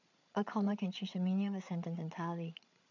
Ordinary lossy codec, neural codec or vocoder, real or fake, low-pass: none; codec, 16 kHz, 8 kbps, FreqCodec, larger model; fake; 7.2 kHz